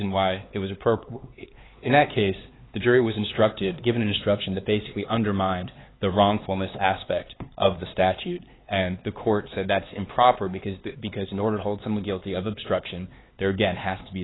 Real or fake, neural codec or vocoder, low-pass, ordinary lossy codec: fake; codec, 16 kHz, 4 kbps, X-Codec, HuBERT features, trained on LibriSpeech; 7.2 kHz; AAC, 16 kbps